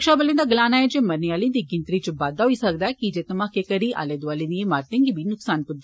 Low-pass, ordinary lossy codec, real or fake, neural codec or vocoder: none; none; real; none